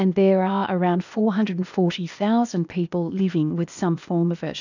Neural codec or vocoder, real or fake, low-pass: codec, 16 kHz, 0.8 kbps, ZipCodec; fake; 7.2 kHz